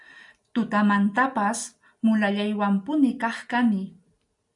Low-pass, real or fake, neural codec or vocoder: 10.8 kHz; real; none